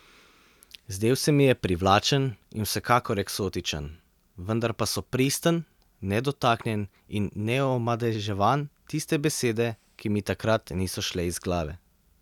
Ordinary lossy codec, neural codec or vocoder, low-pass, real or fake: none; none; 19.8 kHz; real